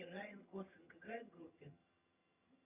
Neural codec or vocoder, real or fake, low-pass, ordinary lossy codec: vocoder, 22.05 kHz, 80 mel bands, HiFi-GAN; fake; 3.6 kHz; Opus, 64 kbps